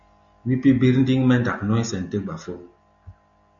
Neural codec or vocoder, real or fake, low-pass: none; real; 7.2 kHz